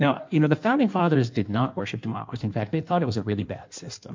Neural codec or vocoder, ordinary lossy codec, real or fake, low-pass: codec, 16 kHz in and 24 kHz out, 1.1 kbps, FireRedTTS-2 codec; MP3, 48 kbps; fake; 7.2 kHz